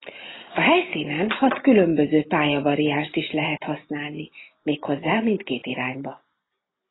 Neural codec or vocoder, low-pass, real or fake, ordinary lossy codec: none; 7.2 kHz; real; AAC, 16 kbps